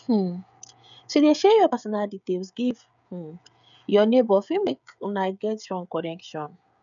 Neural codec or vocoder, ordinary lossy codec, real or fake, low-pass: codec, 16 kHz, 16 kbps, FreqCodec, smaller model; none; fake; 7.2 kHz